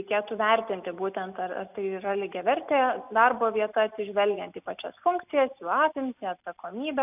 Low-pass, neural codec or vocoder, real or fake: 3.6 kHz; none; real